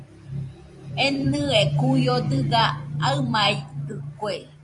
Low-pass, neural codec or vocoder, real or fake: 10.8 kHz; vocoder, 44.1 kHz, 128 mel bands every 512 samples, BigVGAN v2; fake